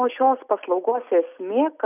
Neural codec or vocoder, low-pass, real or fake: none; 3.6 kHz; real